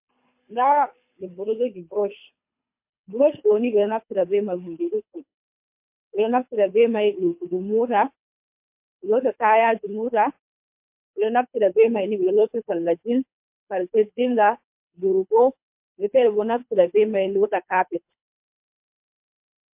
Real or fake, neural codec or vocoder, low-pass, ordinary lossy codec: fake; codec, 24 kHz, 3 kbps, HILCodec; 3.6 kHz; MP3, 32 kbps